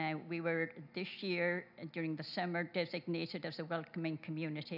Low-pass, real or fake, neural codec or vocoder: 5.4 kHz; real; none